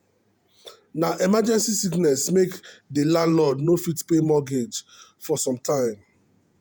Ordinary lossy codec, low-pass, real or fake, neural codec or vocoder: none; none; fake; vocoder, 48 kHz, 128 mel bands, Vocos